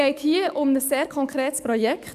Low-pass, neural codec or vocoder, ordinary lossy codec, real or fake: 14.4 kHz; codec, 44.1 kHz, 7.8 kbps, DAC; none; fake